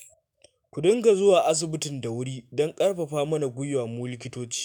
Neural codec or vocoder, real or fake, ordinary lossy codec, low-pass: autoencoder, 48 kHz, 128 numbers a frame, DAC-VAE, trained on Japanese speech; fake; none; none